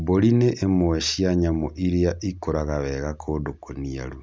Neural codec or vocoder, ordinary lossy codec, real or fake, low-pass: none; none; real; none